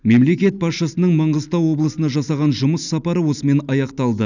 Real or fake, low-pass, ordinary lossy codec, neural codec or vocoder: real; 7.2 kHz; none; none